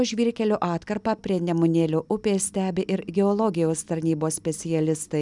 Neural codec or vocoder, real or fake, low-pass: none; real; 10.8 kHz